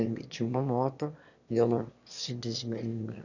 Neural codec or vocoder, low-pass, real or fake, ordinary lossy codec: autoencoder, 22.05 kHz, a latent of 192 numbers a frame, VITS, trained on one speaker; 7.2 kHz; fake; none